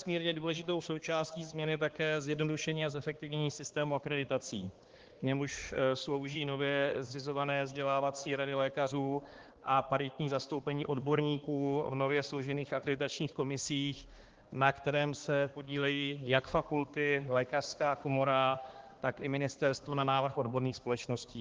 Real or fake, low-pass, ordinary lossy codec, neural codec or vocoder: fake; 7.2 kHz; Opus, 16 kbps; codec, 16 kHz, 2 kbps, X-Codec, HuBERT features, trained on balanced general audio